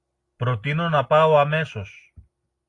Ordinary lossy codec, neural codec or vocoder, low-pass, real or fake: AAC, 48 kbps; none; 10.8 kHz; real